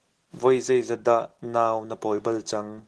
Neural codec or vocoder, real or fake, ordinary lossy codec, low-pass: none; real; Opus, 16 kbps; 10.8 kHz